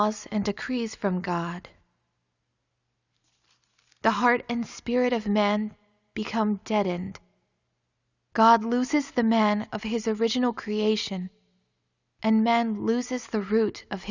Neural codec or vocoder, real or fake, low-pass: none; real; 7.2 kHz